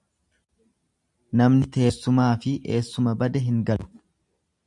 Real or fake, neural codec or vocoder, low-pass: real; none; 10.8 kHz